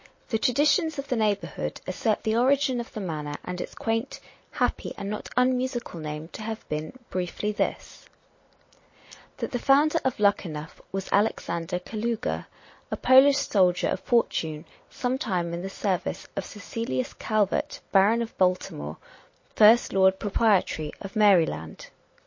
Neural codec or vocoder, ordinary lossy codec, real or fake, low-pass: none; MP3, 32 kbps; real; 7.2 kHz